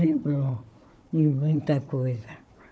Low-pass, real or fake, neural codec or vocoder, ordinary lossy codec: none; fake; codec, 16 kHz, 4 kbps, FunCodec, trained on Chinese and English, 50 frames a second; none